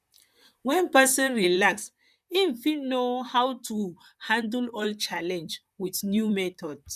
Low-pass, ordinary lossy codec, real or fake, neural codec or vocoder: 14.4 kHz; none; fake; vocoder, 44.1 kHz, 128 mel bands, Pupu-Vocoder